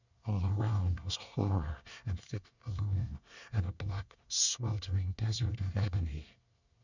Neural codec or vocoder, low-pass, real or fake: codec, 24 kHz, 1 kbps, SNAC; 7.2 kHz; fake